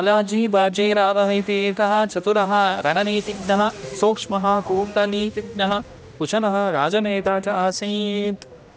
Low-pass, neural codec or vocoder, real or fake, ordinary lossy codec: none; codec, 16 kHz, 1 kbps, X-Codec, HuBERT features, trained on general audio; fake; none